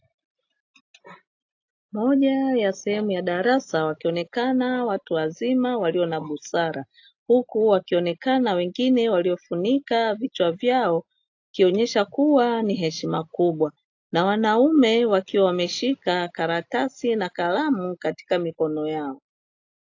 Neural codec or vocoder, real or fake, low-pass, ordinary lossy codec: none; real; 7.2 kHz; AAC, 48 kbps